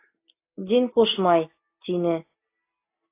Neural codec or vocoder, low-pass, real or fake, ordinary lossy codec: none; 3.6 kHz; real; AAC, 24 kbps